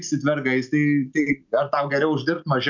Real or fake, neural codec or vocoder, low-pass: real; none; 7.2 kHz